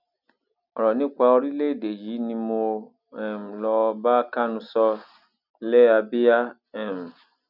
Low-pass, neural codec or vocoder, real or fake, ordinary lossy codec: 5.4 kHz; none; real; none